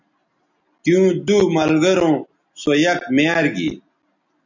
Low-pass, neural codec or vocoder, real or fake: 7.2 kHz; none; real